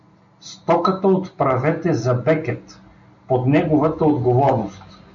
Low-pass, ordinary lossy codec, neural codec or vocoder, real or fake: 7.2 kHz; MP3, 48 kbps; none; real